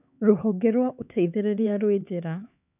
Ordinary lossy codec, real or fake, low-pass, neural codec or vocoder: none; fake; 3.6 kHz; codec, 16 kHz, 4 kbps, X-Codec, HuBERT features, trained on LibriSpeech